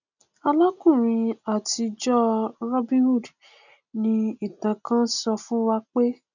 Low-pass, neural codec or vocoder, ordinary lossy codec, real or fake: 7.2 kHz; none; none; real